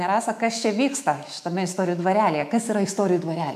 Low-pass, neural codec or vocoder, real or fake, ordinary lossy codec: 14.4 kHz; autoencoder, 48 kHz, 128 numbers a frame, DAC-VAE, trained on Japanese speech; fake; AAC, 96 kbps